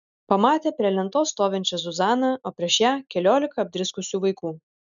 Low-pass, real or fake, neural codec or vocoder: 7.2 kHz; real; none